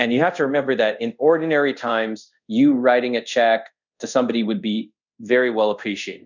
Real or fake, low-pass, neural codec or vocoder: fake; 7.2 kHz; codec, 24 kHz, 0.5 kbps, DualCodec